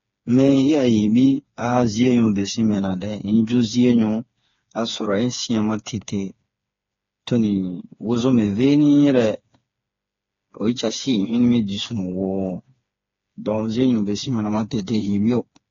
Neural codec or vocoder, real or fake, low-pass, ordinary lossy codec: codec, 16 kHz, 4 kbps, FreqCodec, smaller model; fake; 7.2 kHz; AAC, 32 kbps